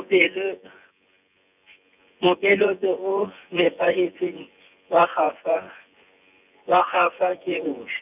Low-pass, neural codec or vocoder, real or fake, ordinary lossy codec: 3.6 kHz; vocoder, 24 kHz, 100 mel bands, Vocos; fake; none